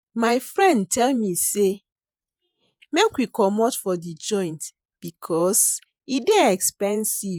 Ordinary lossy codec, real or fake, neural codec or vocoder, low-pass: none; fake; vocoder, 48 kHz, 128 mel bands, Vocos; none